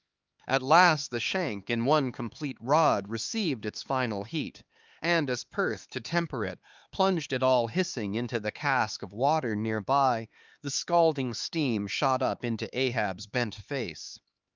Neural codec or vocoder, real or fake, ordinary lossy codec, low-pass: codec, 16 kHz, 4 kbps, X-Codec, HuBERT features, trained on LibriSpeech; fake; Opus, 24 kbps; 7.2 kHz